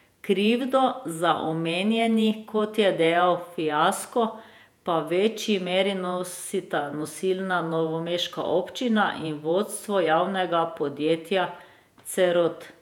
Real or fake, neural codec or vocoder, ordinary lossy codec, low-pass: fake; vocoder, 48 kHz, 128 mel bands, Vocos; none; 19.8 kHz